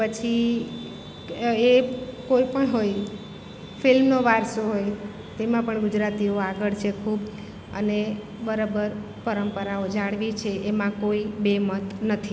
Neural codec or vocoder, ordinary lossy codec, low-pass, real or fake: none; none; none; real